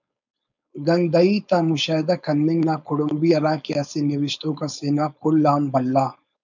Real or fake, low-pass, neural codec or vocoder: fake; 7.2 kHz; codec, 16 kHz, 4.8 kbps, FACodec